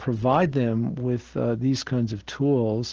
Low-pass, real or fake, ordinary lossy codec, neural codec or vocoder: 7.2 kHz; real; Opus, 16 kbps; none